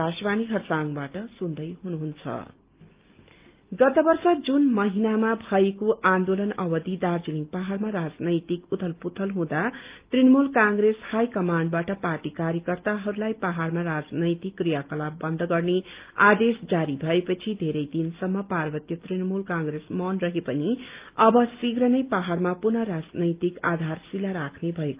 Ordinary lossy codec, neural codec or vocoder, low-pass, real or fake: Opus, 32 kbps; none; 3.6 kHz; real